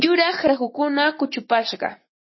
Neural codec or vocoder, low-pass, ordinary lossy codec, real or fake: none; 7.2 kHz; MP3, 24 kbps; real